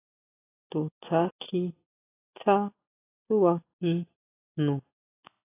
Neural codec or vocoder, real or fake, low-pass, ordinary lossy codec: none; real; 3.6 kHz; AAC, 16 kbps